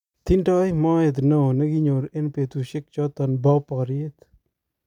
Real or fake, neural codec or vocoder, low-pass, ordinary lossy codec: real; none; 19.8 kHz; none